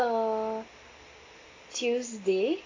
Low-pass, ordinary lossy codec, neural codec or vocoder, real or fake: 7.2 kHz; AAC, 32 kbps; none; real